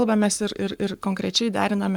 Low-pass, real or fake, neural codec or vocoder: 19.8 kHz; fake; codec, 44.1 kHz, 7.8 kbps, Pupu-Codec